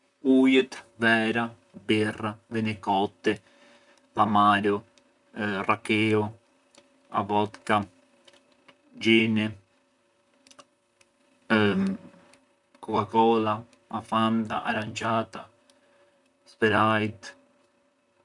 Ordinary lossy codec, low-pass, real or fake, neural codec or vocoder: none; 10.8 kHz; fake; vocoder, 44.1 kHz, 128 mel bands, Pupu-Vocoder